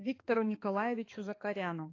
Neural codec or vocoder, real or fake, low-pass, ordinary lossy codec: codec, 16 kHz, 2 kbps, X-Codec, HuBERT features, trained on balanced general audio; fake; 7.2 kHz; AAC, 32 kbps